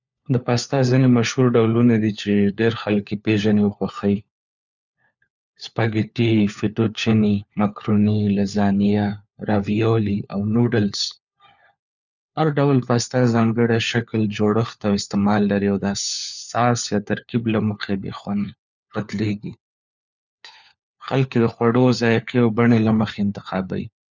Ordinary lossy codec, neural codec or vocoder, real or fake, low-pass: none; codec, 16 kHz, 4 kbps, FunCodec, trained on LibriTTS, 50 frames a second; fake; 7.2 kHz